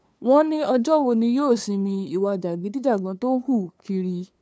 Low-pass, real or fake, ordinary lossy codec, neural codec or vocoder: none; fake; none; codec, 16 kHz, 2 kbps, FunCodec, trained on LibriTTS, 25 frames a second